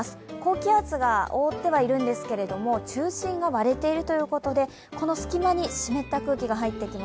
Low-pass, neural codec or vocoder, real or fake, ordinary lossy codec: none; none; real; none